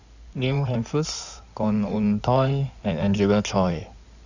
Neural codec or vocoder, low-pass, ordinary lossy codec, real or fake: codec, 16 kHz in and 24 kHz out, 2.2 kbps, FireRedTTS-2 codec; 7.2 kHz; none; fake